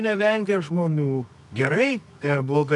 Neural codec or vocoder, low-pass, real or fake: codec, 24 kHz, 0.9 kbps, WavTokenizer, medium music audio release; 10.8 kHz; fake